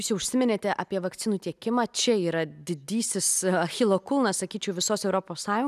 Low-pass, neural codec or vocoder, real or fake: 14.4 kHz; none; real